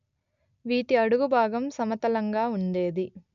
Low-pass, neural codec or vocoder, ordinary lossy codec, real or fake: 7.2 kHz; none; none; real